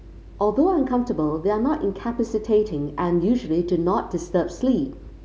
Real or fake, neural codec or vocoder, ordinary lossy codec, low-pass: real; none; none; none